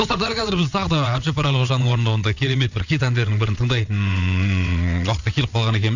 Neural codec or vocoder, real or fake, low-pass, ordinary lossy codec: vocoder, 22.05 kHz, 80 mel bands, WaveNeXt; fake; 7.2 kHz; none